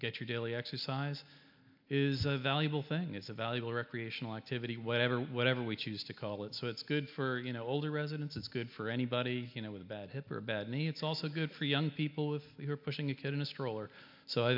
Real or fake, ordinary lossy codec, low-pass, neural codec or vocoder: real; MP3, 48 kbps; 5.4 kHz; none